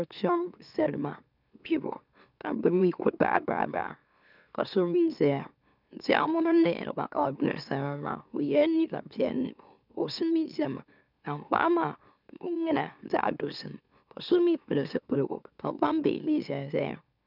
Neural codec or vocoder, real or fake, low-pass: autoencoder, 44.1 kHz, a latent of 192 numbers a frame, MeloTTS; fake; 5.4 kHz